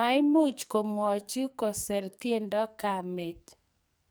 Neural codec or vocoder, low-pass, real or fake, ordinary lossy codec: codec, 44.1 kHz, 2.6 kbps, SNAC; none; fake; none